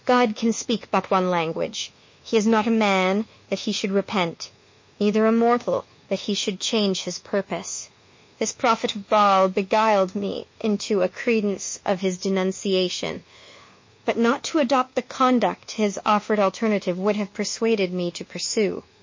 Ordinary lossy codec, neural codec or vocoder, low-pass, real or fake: MP3, 32 kbps; codec, 24 kHz, 1.2 kbps, DualCodec; 7.2 kHz; fake